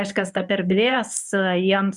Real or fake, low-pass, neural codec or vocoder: fake; 10.8 kHz; codec, 24 kHz, 0.9 kbps, WavTokenizer, medium speech release version 1